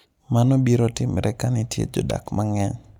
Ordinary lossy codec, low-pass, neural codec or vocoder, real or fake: none; 19.8 kHz; none; real